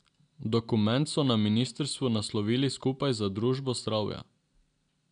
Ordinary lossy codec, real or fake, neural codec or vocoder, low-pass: none; real; none; 9.9 kHz